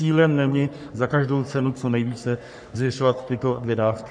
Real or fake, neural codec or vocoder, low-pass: fake; codec, 44.1 kHz, 3.4 kbps, Pupu-Codec; 9.9 kHz